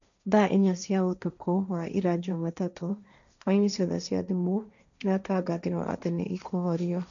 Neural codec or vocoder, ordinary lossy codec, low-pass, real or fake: codec, 16 kHz, 1.1 kbps, Voila-Tokenizer; none; 7.2 kHz; fake